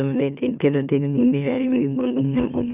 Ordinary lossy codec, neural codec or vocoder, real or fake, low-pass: none; autoencoder, 44.1 kHz, a latent of 192 numbers a frame, MeloTTS; fake; 3.6 kHz